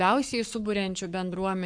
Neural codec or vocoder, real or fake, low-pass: codec, 44.1 kHz, 7.8 kbps, Pupu-Codec; fake; 9.9 kHz